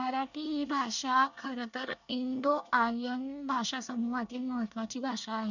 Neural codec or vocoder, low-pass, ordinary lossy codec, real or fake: codec, 24 kHz, 1 kbps, SNAC; 7.2 kHz; none; fake